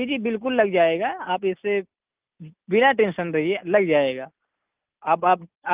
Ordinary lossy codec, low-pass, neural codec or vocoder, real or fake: Opus, 24 kbps; 3.6 kHz; none; real